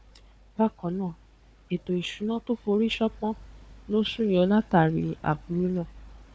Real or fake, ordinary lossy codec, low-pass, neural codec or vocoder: fake; none; none; codec, 16 kHz, 4 kbps, FunCodec, trained on Chinese and English, 50 frames a second